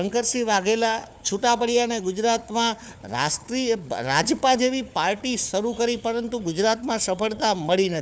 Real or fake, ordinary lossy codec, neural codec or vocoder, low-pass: fake; none; codec, 16 kHz, 4 kbps, FunCodec, trained on Chinese and English, 50 frames a second; none